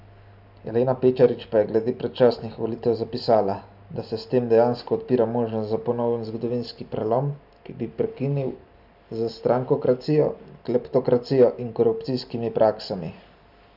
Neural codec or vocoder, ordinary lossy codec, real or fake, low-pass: none; none; real; 5.4 kHz